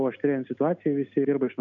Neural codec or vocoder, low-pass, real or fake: none; 7.2 kHz; real